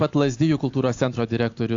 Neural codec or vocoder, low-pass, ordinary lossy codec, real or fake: none; 7.2 kHz; AAC, 48 kbps; real